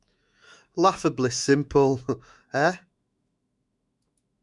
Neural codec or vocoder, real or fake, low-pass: autoencoder, 48 kHz, 128 numbers a frame, DAC-VAE, trained on Japanese speech; fake; 10.8 kHz